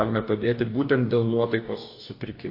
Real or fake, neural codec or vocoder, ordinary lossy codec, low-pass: fake; codec, 44.1 kHz, 2.6 kbps, DAC; MP3, 32 kbps; 5.4 kHz